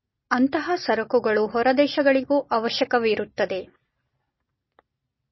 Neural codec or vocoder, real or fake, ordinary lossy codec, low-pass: none; real; MP3, 24 kbps; 7.2 kHz